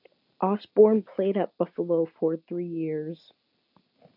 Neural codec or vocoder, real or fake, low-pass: none; real; 5.4 kHz